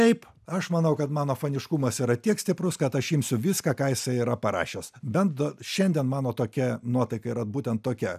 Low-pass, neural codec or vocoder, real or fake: 14.4 kHz; none; real